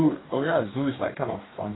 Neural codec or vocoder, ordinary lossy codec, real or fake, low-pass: codec, 44.1 kHz, 2.6 kbps, DAC; AAC, 16 kbps; fake; 7.2 kHz